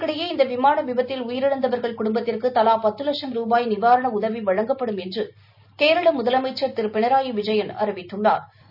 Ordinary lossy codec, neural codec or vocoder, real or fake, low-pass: none; none; real; 5.4 kHz